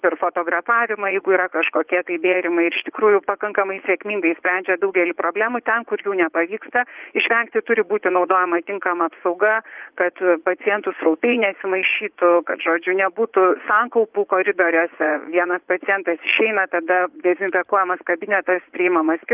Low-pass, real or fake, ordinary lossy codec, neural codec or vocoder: 3.6 kHz; fake; Opus, 24 kbps; vocoder, 44.1 kHz, 80 mel bands, Vocos